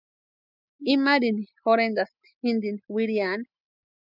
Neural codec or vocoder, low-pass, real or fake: none; 5.4 kHz; real